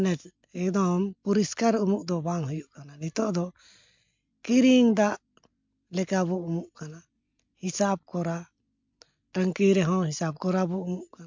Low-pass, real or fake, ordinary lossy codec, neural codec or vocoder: 7.2 kHz; real; MP3, 64 kbps; none